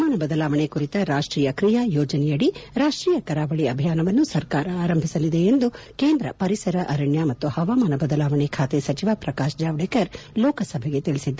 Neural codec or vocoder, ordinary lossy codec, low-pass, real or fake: none; none; none; real